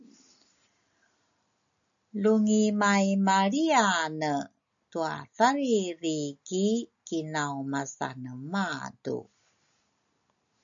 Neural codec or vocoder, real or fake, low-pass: none; real; 7.2 kHz